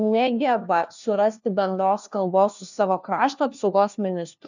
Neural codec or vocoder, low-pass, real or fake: codec, 16 kHz, 1 kbps, FunCodec, trained on LibriTTS, 50 frames a second; 7.2 kHz; fake